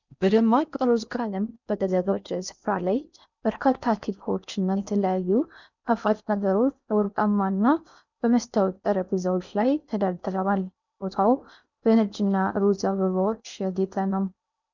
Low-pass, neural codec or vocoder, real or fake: 7.2 kHz; codec, 16 kHz in and 24 kHz out, 0.6 kbps, FocalCodec, streaming, 4096 codes; fake